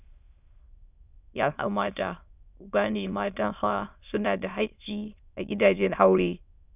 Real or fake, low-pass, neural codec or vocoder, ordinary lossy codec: fake; 3.6 kHz; autoencoder, 22.05 kHz, a latent of 192 numbers a frame, VITS, trained on many speakers; none